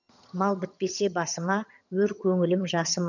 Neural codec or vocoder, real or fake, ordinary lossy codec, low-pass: vocoder, 22.05 kHz, 80 mel bands, HiFi-GAN; fake; none; 7.2 kHz